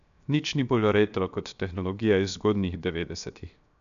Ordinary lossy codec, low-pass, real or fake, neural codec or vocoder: none; 7.2 kHz; fake; codec, 16 kHz, 0.7 kbps, FocalCodec